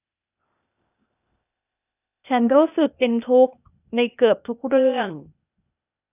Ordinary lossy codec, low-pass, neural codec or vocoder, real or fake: none; 3.6 kHz; codec, 16 kHz, 0.8 kbps, ZipCodec; fake